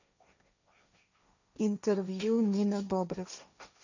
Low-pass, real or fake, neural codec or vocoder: 7.2 kHz; fake; codec, 16 kHz, 1.1 kbps, Voila-Tokenizer